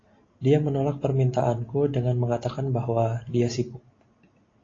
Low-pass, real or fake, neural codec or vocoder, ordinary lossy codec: 7.2 kHz; real; none; AAC, 32 kbps